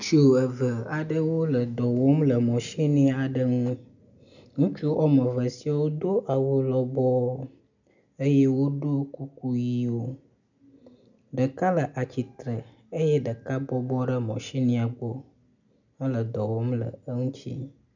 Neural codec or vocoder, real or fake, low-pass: none; real; 7.2 kHz